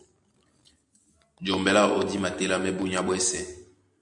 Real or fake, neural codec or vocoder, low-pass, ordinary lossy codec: real; none; 10.8 kHz; MP3, 64 kbps